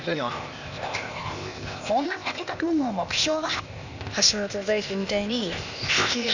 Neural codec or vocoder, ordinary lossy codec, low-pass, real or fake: codec, 16 kHz, 0.8 kbps, ZipCodec; none; 7.2 kHz; fake